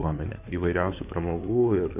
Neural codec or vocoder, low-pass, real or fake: codec, 16 kHz in and 24 kHz out, 2.2 kbps, FireRedTTS-2 codec; 3.6 kHz; fake